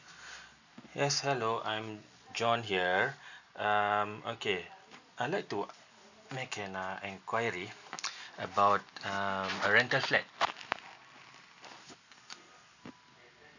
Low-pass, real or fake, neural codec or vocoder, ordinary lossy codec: 7.2 kHz; real; none; none